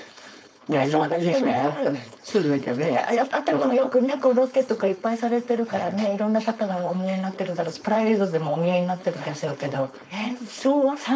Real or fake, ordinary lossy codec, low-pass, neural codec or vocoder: fake; none; none; codec, 16 kHz, 4.8 kbps, FACodec